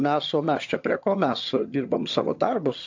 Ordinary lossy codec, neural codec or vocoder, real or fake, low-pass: AAC, 48 kbps; vocoder, 22.05 kHz, 80 mel bands, HiFi-GAN; fake; 7.2 kHz